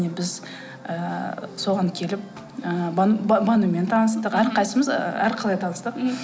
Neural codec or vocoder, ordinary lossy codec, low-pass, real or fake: none; none; none; real